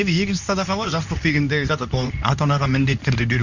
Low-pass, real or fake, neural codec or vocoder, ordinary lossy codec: 7.2 kHz; fake; codec, 24 kHz, 0.9 kbps, WavTokenizer, medium speech release version 2; none